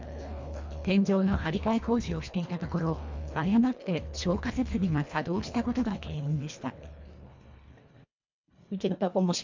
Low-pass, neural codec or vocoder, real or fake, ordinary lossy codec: 7.2 kHz; codec, 24 kHz, 1.5 kbps, HILCodec; fake; MP3, 64 kbps